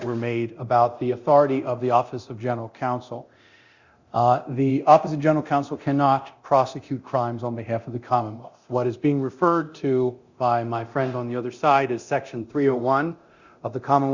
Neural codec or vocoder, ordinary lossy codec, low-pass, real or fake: codec, 24 kHz, 0.9 kbps, DualCodec; Opus, 64 kbps; 7.2 kHz; fake